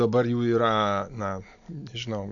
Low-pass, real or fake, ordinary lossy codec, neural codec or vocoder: 7.2 kHz; real; AAC, 48 kbps; none